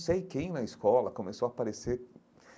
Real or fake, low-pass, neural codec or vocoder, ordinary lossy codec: real; none; none; none